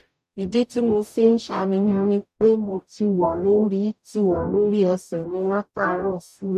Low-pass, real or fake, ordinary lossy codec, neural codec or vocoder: 14.4 kHz; fake; none; codec, 44.1 kHz, 0.9 kbps, DAC